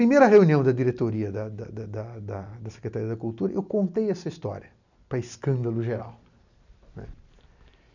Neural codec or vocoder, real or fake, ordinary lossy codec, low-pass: none; real; none; 7.2 kHz